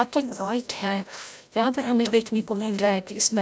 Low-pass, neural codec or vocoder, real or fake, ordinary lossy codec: none; codec, 16 kHz, 0.5 kbps, FreqCodec, larger model; fake; none